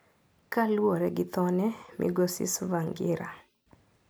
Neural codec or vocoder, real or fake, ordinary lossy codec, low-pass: none; real; none; none